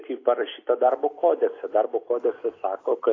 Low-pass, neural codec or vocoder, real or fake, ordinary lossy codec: 7.2 kHz; none; real; AAC, 32 kbps